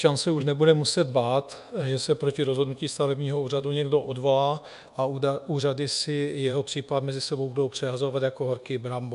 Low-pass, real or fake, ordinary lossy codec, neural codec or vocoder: 10.8 kHz; fake; AAC, 96 kbps; codec, 24 kHz, 1.2 kbps, DualCodec